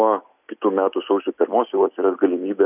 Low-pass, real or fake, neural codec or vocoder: 3.6 kHz; real; none